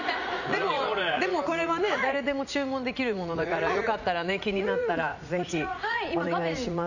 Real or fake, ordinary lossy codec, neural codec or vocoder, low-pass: fake; none; vocoder, 44.1 kHz, 80 mel bands, Vocos; 7.2 kHz